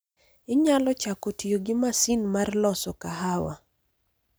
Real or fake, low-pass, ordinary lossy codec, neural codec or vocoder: real; none; none; none